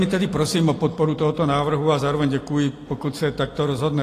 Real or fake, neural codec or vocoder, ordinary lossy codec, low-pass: real; none; AAC, 48 kbps; 14.4 kHz